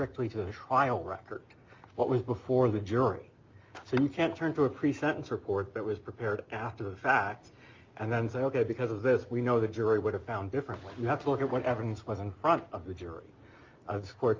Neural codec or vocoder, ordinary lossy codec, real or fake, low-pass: none; Opus, 24 kbps; real; 7.2 kHz